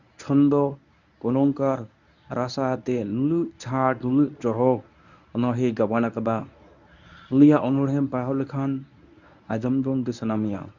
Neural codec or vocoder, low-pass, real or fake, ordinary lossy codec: codec, 24 kHz, 0.9 kbps, WavTokenizer, medium speech release version 1; 7.2 kHz; fake; none